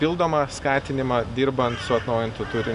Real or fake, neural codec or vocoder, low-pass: real; none; 10.8 kHz